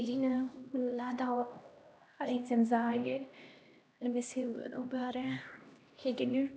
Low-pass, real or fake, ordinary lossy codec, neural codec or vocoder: none; fake; none; codec, 16 kHz, 1 kbps, X-Codec, HuBERT features, trained on LibriSpeech